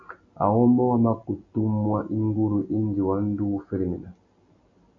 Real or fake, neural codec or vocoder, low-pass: real; none; 7.2 kHz